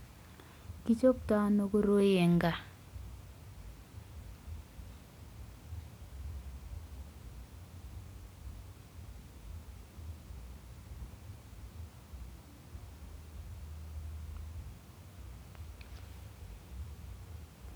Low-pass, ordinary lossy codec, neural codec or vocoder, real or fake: none; none; none; real